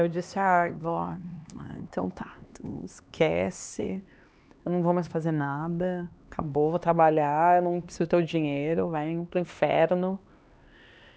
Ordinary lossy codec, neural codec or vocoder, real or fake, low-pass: none; codec, 16 kHz, 2 kbps, X-Codec, HuBERT features, trained on LibriSpeech; fake; none